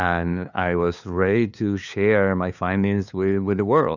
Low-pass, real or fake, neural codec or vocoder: 7.2 kHz; fake; codec, 16 kHz, 2 kbps, FunCodec, trained on LibriTTS, 25 frames a second